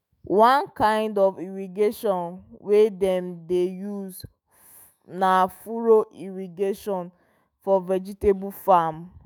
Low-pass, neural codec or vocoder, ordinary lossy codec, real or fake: none; autoencoder, 48 kHz, 128 numbers a frame, DAC-VAE, trained on Japanese speech; none; fake